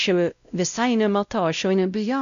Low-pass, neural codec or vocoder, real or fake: 7.2 kHz; codec, 16 kHz, 0.5 kbps, X-Codec, WavLM features, trained on Multilingual LibriSpeech; fake